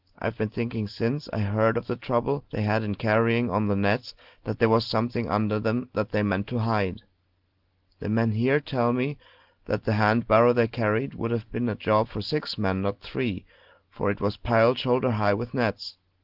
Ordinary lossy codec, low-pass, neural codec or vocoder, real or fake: Opus, 16 kbps; 5.4 kHz; none; real